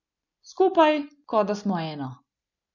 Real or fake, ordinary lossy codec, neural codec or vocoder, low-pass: real; none; none; 7.2 kHz